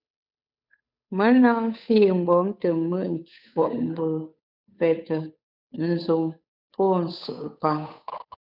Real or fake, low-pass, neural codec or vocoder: fake; 5.4 kHz; codec, 16 kHz, 8 kbps, FunCodec, trained on Chinese and English, 25 frames a second